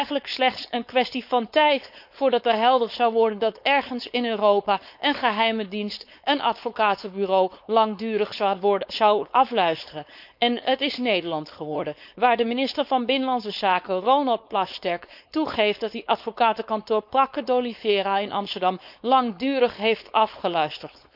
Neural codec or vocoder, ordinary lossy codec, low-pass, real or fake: codec, 16 kHz, 4.8 kbps, FACodec; none; 5.4 kHz; fake